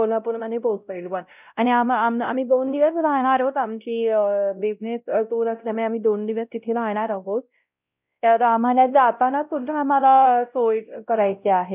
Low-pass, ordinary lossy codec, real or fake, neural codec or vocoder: 3.6 kHz; none; fake; codec, 16 kHz, 0.5 kbps, X-Codec, WavLM features, trained on Multilingual LibriSpeech